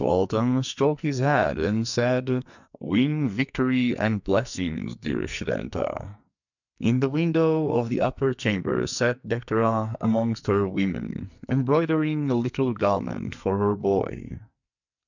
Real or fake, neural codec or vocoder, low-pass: fake; codec, 44.1 kHz, 2.6 kbps, SNAC; 7.2 kHz